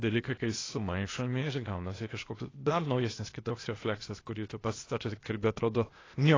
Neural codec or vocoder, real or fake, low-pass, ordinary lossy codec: codec, 16 kHz, 0.8 kbps, ZipCodec; fake; 7.2 kHz; AAC, 32 kbps